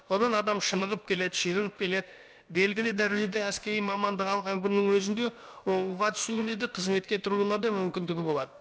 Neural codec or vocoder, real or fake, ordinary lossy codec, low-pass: codec, 16 kHz, about 1 kbps, DyCAST, with the encoder's durations; fake; none; none